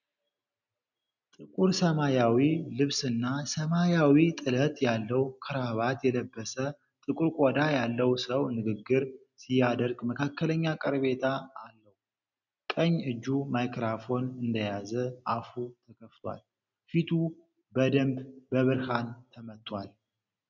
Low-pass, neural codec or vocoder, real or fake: 7.2 kHz; none; real